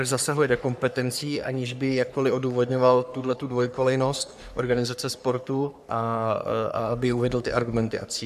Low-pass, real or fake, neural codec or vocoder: 14.4 kHz; fake; codec, 44.1 kHz, 3.4 kbps, Pupu-Codec